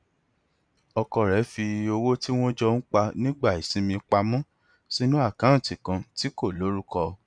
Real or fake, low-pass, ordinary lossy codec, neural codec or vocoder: real; 9.9 kHz; none; none